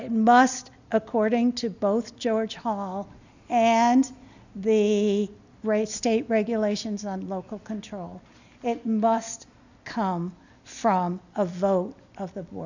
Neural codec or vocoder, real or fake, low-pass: none; real; 7.2 kHz